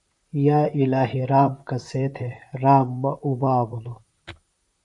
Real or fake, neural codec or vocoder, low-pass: fake; vocoder, 44.1 kHz, 128 mel bands, Pupu-Vocoder; 10.8 kHz